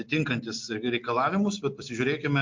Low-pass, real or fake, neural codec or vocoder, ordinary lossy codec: 7.2 kHz; real; none; MP3, 48 kbps